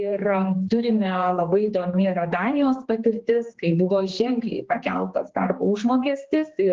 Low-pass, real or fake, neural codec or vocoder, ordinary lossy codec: 7.2 kHz; fake; codec, 16 kHz, 2 kbps, X-Codec, HuBERT features, trained on general audio; Opus, 16 kbps